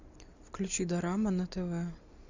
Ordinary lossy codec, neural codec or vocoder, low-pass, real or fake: Opus, 64 kbps; none; 7.2 kHz; real